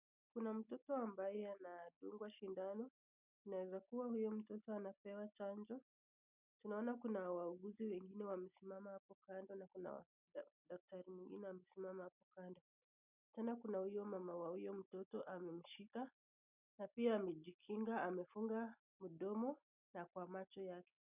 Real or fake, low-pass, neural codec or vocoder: real; 3.6 kHz; none